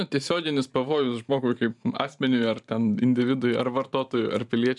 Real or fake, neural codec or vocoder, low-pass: fake; vocoder, 44.1 kHz, 128 mel bands every 512 samples, BigVGAN v2; 10.8 kHz